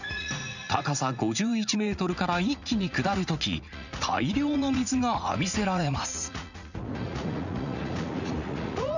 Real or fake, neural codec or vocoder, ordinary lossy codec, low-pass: fake; vocoder, 44.1 kHz, 80 mel bands, Vocos; none; 7.2 kHz